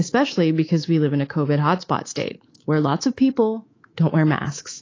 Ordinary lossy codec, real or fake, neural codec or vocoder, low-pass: AAC, 32 kbps; fake; codec, 24 kHz, 3.1 kbps, DualCodec; 7.2 kHz